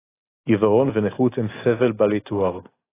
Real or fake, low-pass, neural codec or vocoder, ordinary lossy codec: real; 3.6 kHz; none; AAC, 16 kbps